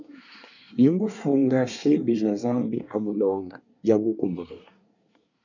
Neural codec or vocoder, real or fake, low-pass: codec, 24 kHz, 1 kbps, SNAC; fake; 7.2 kHz